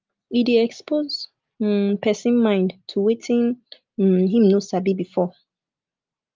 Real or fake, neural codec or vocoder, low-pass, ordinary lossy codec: real; none; 7.2 kHz; Opus, 24 kbps